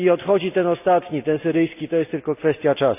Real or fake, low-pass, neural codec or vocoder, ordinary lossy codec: real; 3.6 kHz; none; none